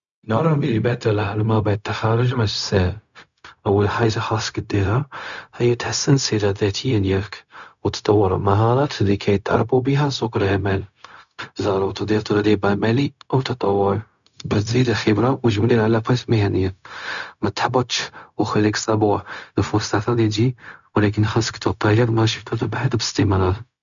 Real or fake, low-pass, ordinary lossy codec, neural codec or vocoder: fake; 7.2 kHz; none; codec, 16 kHz, 0.4 kbps, LongCat-Audio-Codec